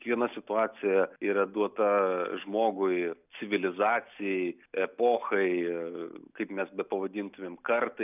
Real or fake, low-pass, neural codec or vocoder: real; 3.6 kHz; none